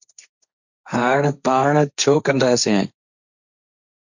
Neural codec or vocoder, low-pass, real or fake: codec, 16 kHz, 1.1 kbps, Voila-Tokenizer; 7.2 kHz; fake